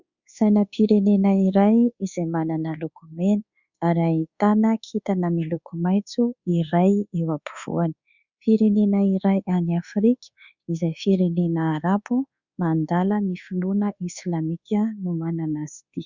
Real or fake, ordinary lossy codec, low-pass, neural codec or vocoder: fake; Opus, 64 kbps; 7.2 kHz; codec, 24 kHz, 1.2 kbps, DualCodec